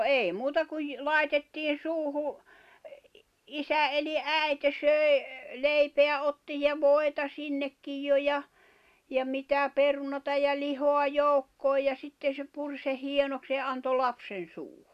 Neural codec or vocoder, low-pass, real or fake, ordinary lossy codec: none; 14.4 kHz; real; none